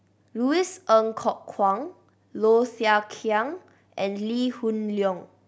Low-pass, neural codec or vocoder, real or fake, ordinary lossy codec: none; none; real; none